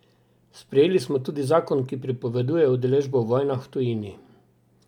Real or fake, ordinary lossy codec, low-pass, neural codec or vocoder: real; none; 19.8 kHz; none